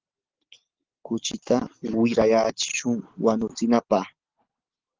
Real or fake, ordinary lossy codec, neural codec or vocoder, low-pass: real; Opus, 16 kbps; none; 7.2 kHz